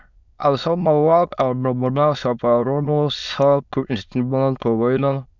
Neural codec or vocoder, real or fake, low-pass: autoencoder, 22.05 kHz, a latent of 192 numbers a frame, VITS, trained on many speakers; fake; 7.2 kHz